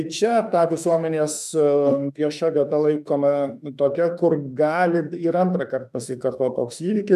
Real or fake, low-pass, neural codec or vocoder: fake; 14.4 kHz; autoencoder, 48 kHz, 32 numbers a frame, DAC-VAE, trained on Japanese speech